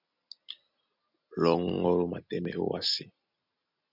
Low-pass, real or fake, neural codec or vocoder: 5.4 kHz; real; none